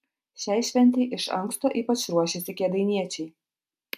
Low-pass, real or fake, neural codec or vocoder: 14.4 kHz; real; none